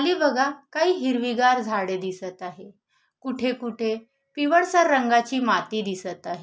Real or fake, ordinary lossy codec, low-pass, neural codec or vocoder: real; none; none; none